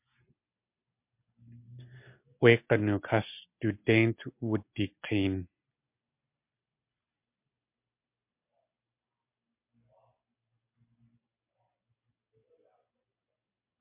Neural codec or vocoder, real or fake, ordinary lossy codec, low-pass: none; real; MP3, 32 kbps; 3.6 kHz